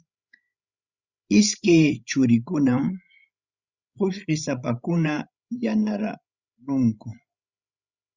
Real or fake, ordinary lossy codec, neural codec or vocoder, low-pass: fake; Opus, 64 kbps; codec, 16 kHz, 16 kbps, FreqCodec, larger model; 7.2 kHz